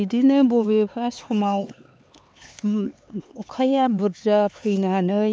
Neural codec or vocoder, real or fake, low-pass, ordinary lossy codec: codec, 16 kHz, 4 kbps, X-Codec, HuBERT features, trained on LibriSpeech; fake; none; none